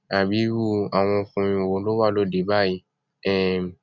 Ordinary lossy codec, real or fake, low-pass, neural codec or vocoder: none; real; 7.2 kHz; none